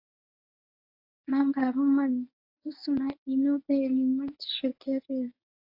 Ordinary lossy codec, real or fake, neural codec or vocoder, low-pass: AAC, 32 kbps; fake; codec, 24 kHz, 0.9 kbps, WavTokenizer, medium speech release version 1; 5.4 kHz